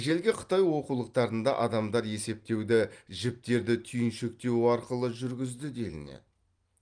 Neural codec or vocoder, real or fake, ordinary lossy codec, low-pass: none; real; Opus, 32 kbps; 9.9 kHz